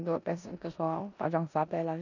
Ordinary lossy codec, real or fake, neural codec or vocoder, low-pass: none; fake; codec, 16 kHz in and 24 kHz out, 0.9 kbps, LongCat-Audio-Codec, four codebook decoder; 7.2 kHz